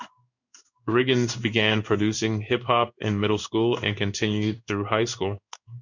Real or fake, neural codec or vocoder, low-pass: fake; codec, 16 kHz in and 24 kHz out, 1 kbps, XY-Tokenizer; 7.2 kHz